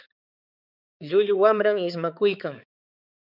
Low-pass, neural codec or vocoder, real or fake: 5.4 kHz; codec, 16 kHz, 4 kbps, X-Codec, HuBERT features, trained on balanced general audio; fake